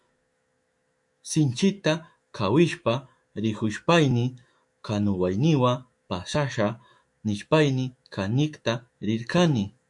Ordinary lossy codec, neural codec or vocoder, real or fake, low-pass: MP3, 64 kbps; autoencoder, 48 kHz, 128 numbers a frame, DAC-VAE, trained on Japanese speech; fake; 10.8 kHz